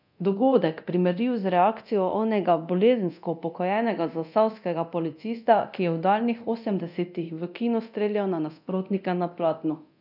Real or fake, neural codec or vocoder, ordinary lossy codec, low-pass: fake; codec, 24 kHz, 0.9 kbps, DualCodec; none; 5.4 kHz